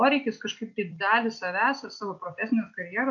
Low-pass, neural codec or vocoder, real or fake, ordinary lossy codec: 7.2 kHz; none; real; MP3, 64 kbps